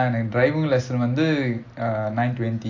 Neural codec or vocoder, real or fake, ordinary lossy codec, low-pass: none; real; AAC, 48 kbps; 7.2 kHz